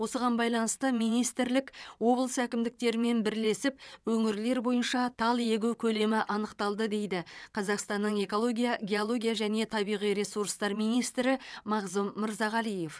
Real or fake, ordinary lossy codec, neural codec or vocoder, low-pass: fake; none; vocoder, 22.05 kHz, 80 mel bands, WaveNeXt; none